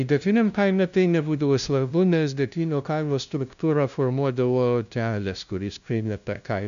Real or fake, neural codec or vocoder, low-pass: fake; codec, 16 kHz, 0.5 kbps, FunCodec, trained on LibriTTS, 25 frames a second; 7.2 kHz